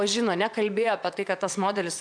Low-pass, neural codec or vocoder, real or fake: 9.9 kHz; vocoder, 24 kHz, 100 mel bands, Vocos; fake